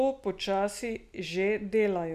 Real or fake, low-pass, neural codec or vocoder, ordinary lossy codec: real; 14.4 kHz; none; none